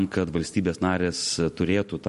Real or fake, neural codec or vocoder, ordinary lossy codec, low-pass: real; none; MP3, 48 kbps; 14.4 kHz